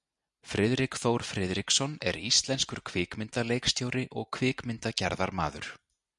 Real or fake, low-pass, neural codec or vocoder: real; 10.8 kHz; none